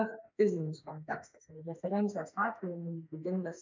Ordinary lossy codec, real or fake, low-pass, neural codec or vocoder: MP3, 64 kbps; fake; 7.2 kHz; autoencoder, 48 kHz, 32 numbers a frame, DAC-VAE, trained on Japanese speech